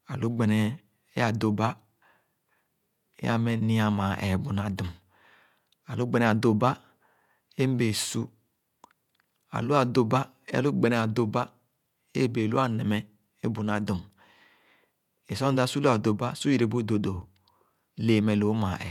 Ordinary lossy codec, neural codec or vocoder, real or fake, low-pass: none; vocoder, 48 kHz, 128 mel bands, Vocos; fake; 19.8 kHz